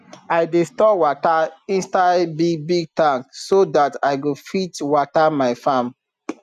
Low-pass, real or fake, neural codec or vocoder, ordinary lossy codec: 14.4 kHz; real; none; none